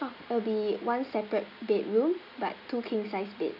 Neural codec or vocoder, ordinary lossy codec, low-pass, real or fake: none; none; 5.4 kHz; real